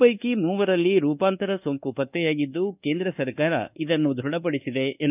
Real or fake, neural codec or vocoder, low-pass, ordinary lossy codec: fake; codec, 24 kHz, 1.2 kbps, DualCodec; 3.6 kHz; none